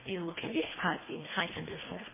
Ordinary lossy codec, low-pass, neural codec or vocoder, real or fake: MP3, 16 kbps; 3.6 kHz; codec, 24 kHz, 1.5 kbps, HILCodec; fake